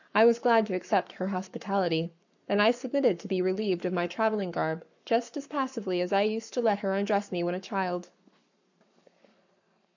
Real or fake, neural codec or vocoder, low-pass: fake; codec, 44.1 kHz, 7.8 kbps, Pupu-Codec; 7.2 kHz